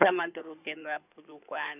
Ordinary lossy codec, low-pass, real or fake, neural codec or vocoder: none; 3.6 kHz; fake; codec, 16 kHz in and 24 kHz out, 2.2 kbps, FireRedTTS-2 codec